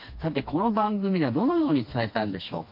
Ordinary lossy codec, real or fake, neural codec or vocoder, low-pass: MP3, 32 kbps; fake; codec, 16 kHz, 2 kbps, FreqCodec, smaller model; 5.4 kHz